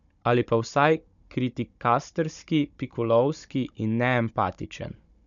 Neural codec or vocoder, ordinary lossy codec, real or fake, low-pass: codec, 16 kHz, 16 kbps, FunCodec, trained on Chinese and English, 50 frames a second; none; fake; 7.2 kHz